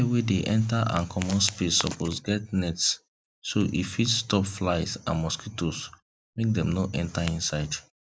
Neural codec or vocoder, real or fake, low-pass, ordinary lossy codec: none; real; none; none